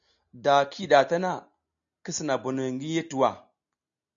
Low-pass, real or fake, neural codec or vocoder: 7.2 kHz; real; none